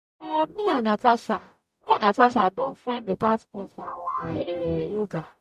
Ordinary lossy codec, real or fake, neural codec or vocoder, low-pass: MP3, 96 kbps; fake; codec, 44.1 kHz, 0.9 kbps, DAC; 14.4 kHz